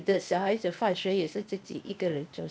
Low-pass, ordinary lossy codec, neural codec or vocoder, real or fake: none; none; codec, 16 kHz, 0.8 kbps, ZipCodec; fake